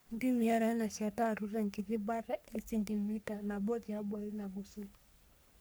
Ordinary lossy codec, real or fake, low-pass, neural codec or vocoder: none; fake; none; codec, 44.1 kHz, 3.4 kbps, Pupu-Codec